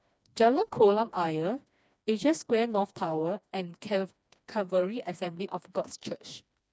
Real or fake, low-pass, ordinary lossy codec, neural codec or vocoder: fake; none; none; codec, 16 kHz, 2 kbps, FreqCodec, smaller model